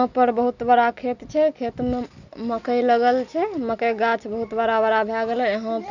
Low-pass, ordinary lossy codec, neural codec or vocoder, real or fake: 7.2 kHz; none; none; real